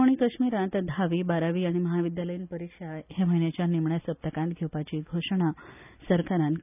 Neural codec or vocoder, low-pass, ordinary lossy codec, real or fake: none; 3.6 kHz; none; real